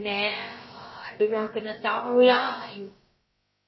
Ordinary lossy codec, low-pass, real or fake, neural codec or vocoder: MP3, 24 kbps; 7.2 kHz; fake; codec, 16 kHz, about 1 kbps, DyCAST, with the encoder's durations